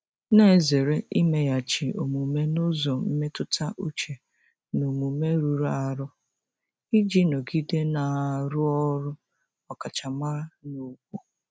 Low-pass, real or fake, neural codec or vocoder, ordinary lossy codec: none; real; none; none